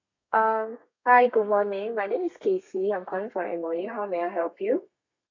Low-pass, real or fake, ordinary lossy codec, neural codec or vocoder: 7.2 kHz; fake; AAC, 48 kbps; codec, 32 kHz, 1.9 kbps, SNAC